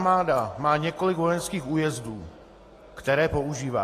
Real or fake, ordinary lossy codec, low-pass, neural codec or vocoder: real; AAC, 48 kbps; 14.4 kHz; none